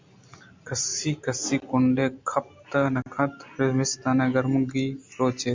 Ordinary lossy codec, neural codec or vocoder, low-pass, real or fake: MP3, 64 kbps; none; 7.2 kHz; real